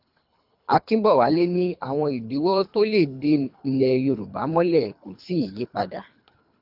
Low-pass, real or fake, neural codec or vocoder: 5.4 kHz; fake; codec, 24 kHz, 3 kbps, HILCodec